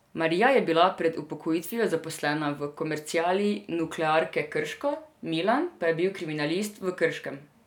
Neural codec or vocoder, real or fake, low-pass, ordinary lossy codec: none; real; 19.8 kHz; none